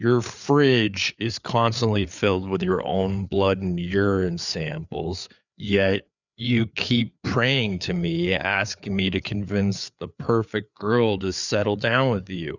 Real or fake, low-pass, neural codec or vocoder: fake; 7.2 kHz; codec, 16 kHz, 4 kbps, FreqCodec, larger model